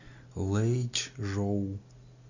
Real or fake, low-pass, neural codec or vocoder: real; 7.2 kHz; none